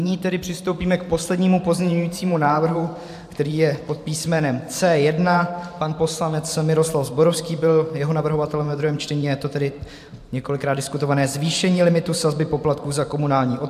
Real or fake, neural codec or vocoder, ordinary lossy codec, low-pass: fake; vocoder, 44.1 kHz, 128 mel bands every 512 samples, BigVGAN v2; AAC, 96 kbps; 14.4 kHz